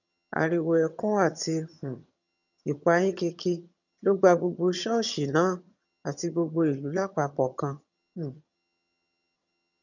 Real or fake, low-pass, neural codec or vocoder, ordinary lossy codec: fake; 7.2 kHz; vocoder, 22.05 kHz, 80 mel bands, HiFi-GAN; none